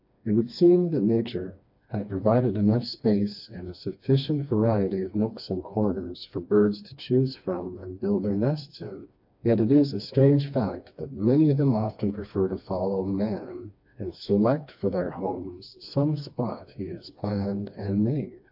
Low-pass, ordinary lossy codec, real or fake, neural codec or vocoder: 5.4 kHz; AAC, 48 kbps; fake; codec, 16 kHz, 2 kbps, FreqCodec, smaller model